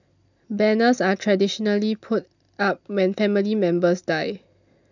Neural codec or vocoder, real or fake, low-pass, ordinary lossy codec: none; real; 7.2 kHz; none